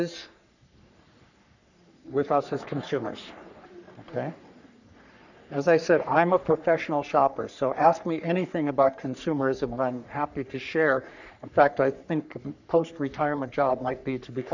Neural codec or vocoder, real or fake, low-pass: codec, 44.1 kHz, 3.4 kbps, Pupu-Codec; fake; 7.2 kHz